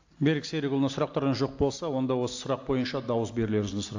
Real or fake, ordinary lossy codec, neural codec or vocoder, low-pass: real; AAC, 48 kbps; none; 7.2 kHz